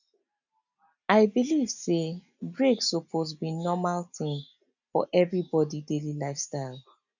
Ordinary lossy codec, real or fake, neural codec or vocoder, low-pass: none; real; none; 7.2 kHz